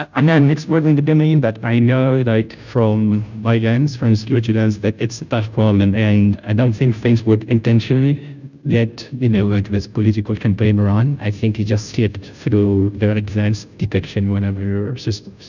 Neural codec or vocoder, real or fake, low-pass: codec, 16 kHz, 0.5 kbps, FunCodec, trained on Chinese and English, 25 frames a second; fake; 7.2 kHz